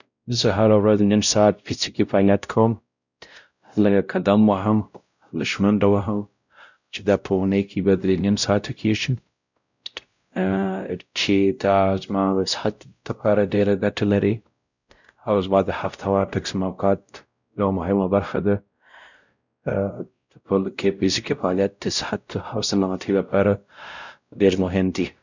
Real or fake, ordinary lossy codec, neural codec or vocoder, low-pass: fake; none; codec, 16 kHz, 0.5 kbps, X-Codec, WavLM features, trained on Multilingual LibriSpeech; 7.2 kHz